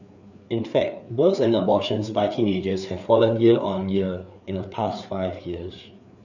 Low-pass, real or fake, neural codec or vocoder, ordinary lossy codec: 7.2 kHz; fake; codec, 16 kHz, 4 kbps, FreqCodec, larger model; none